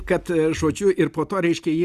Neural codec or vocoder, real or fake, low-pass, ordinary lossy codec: none; real; 14.4 kHz; Opus, 64 kbps